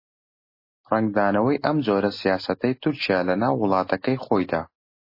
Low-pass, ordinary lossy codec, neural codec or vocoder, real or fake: 5.4 kHz; MP3, 24 kbps; none; real